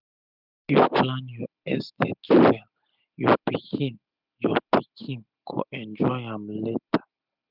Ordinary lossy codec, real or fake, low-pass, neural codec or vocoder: none; real; 5.4 kHz; none